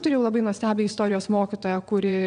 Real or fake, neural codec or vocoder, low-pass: fake; vocoder, 22.05 kHz, 80 mel bands, WaveNeXt; 9.9 kHz